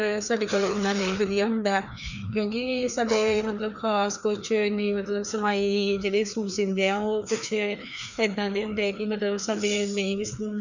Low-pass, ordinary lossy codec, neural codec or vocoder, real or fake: 7.2 kHz; none; codec, 16 kHz, 2 kbps, FreqCodec, larger model; fake